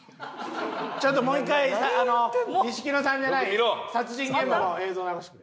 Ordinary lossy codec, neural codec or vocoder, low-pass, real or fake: none; none; none; real